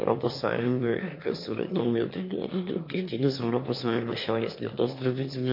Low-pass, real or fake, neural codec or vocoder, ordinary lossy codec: 5.4 kHz; fake; autoencoder, 22.05 kHz, a latent of 192 numbers a frame, VITS, trained on one speaker; MP3, 32 kbps